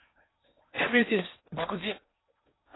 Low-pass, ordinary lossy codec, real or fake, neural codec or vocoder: 7.2 kHz; AAC, 16 kbps; fake; codec, 16 kHz in and 24 kHz out, 0.8 kbps, FocalCodec, streaming, 65536 codes